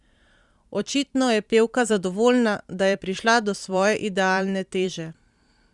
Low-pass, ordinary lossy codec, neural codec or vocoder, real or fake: 10.8 kHz; Opus, 64 kbps; vocoder, 44.1 kHz, 128 mel bands every 512 samples, BigVGAN v2; fake